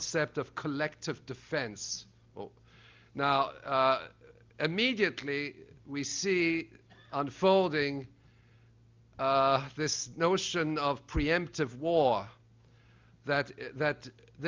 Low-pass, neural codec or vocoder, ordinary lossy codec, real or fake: 7.2 kHz; none; Opus, 24 kbps; real